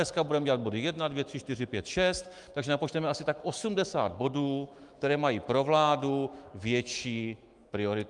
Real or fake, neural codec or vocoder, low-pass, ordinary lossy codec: fake; autoencoder, 48 kHz, 128 numbers a frame, DAC-VAE, trained on Japanese speech; 10.8 kHz; Opus, 32 kbps